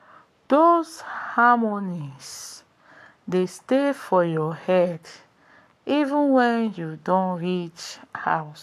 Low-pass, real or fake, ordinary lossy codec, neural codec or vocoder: 14.4 kHz; fake; AAC, 96 kbps; codec, 44.1 kHz, 7.8 kbps, Pupu-Codec